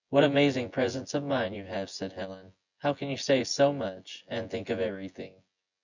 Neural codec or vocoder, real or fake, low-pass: vocoder, 24 kHz, 100 mel bands, Vocos; fake; 7.2 kHz